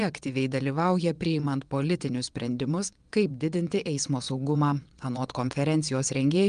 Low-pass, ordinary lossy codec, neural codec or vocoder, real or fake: 9.9 kHz; Opus, 32 kbps; vocoder, 22.05 kHz, 80 mel bands, WaveNeXt; fake